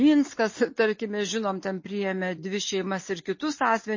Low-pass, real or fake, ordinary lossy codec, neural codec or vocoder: 7.2 kHz; real; MP3, 32 kbps; none